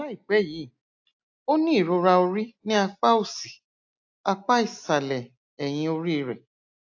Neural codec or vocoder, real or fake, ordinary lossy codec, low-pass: none; real; AAC, 48 kbps; 7.2 kHz